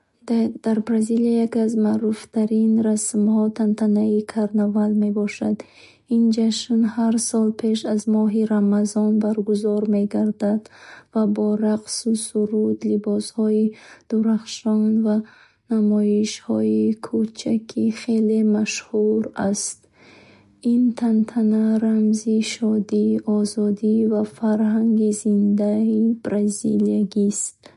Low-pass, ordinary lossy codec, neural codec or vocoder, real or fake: 14.4 kHz; MP3, 48 kbps; none; real